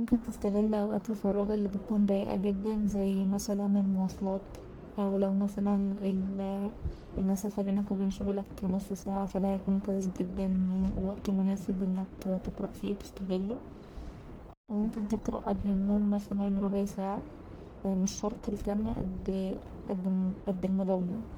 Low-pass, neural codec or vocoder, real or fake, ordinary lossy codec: none; codec, 44.1 kHz, 1.7 kbps, Pupu-Codec; fake; none